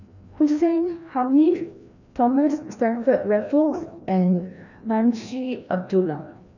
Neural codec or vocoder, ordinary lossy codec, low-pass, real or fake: codec, 16 kHz, 1 kbps, FreqCodec, larger model; none; 7.2 kHz; fake